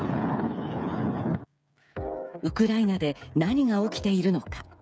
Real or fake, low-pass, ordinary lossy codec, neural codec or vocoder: fake; none; none; codec, 16 kHz, 8 kbps, FreqCodec, smaller model